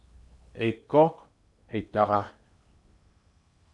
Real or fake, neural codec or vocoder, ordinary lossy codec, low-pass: fake; codec, 16 kHz in and 24 kHz out, 0.8 kbps, FocalCodec, streaming, 65536 codes; MP3, 96 kbps; 10.8 kHz